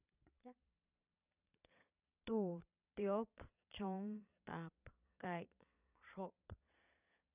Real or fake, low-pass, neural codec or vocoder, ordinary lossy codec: fake; 3.6 kHz; vocoder, 22.05 kHz, 80 mel bands, Vocos; none